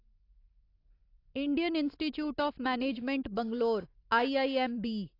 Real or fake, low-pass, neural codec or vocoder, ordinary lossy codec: real; 5.4 kHz; none; AAC, 32 kbps